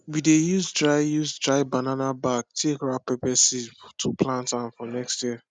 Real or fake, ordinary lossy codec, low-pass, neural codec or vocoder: real; none; none; none